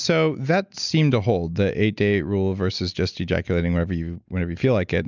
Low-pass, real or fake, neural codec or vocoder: 7.2 kHz; real; none